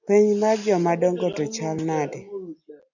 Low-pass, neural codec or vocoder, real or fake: 7.2 kHz; none; real